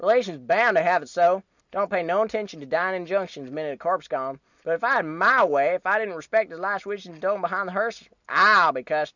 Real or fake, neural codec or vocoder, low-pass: real; none; 7.2 kHz